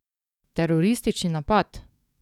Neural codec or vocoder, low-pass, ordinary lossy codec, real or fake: codec, 44.1 kHz, 7.8 kbps, DAC; 19.8 kHz; none; fake